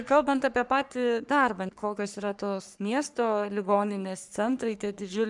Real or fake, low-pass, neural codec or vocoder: fake; 10.8 kHz; codec, 44.1 kHz, 3.4 kbps, Pupu-Codec